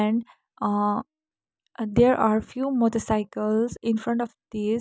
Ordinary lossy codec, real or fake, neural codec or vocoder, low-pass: none; real; none; none